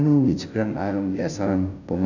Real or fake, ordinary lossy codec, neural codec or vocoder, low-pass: fake; none; codec, 16 kHz, 0.5 kbps, FunCodec, trained on Chinese and English, 25 frames a second; 7.2 kHz